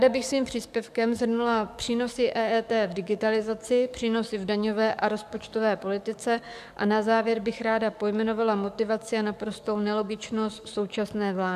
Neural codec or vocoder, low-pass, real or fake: codec, 44.1 kHz, 7.8 kbps, DAC; 14.4 kHz; fake